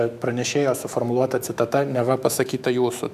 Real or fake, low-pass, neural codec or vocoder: fake; 14.4 kHz; autoencoder, 48 kHz, 128 numbers a frame, DAC-VAE, trained on Japanese speech